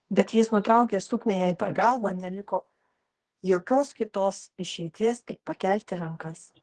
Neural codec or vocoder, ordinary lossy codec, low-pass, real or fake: codec, 24 kHz, 0.9 kbps, WavTokenizer, medium music audio release; Opus, 16 kbps; 10.8 kHz; fake